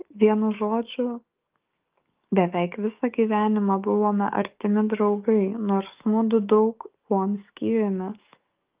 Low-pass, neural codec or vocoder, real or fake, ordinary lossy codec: 3.6 kHz; codec, 44.1 kHz, 7.8 kbps, DAC; fake; Opus, 24 kbps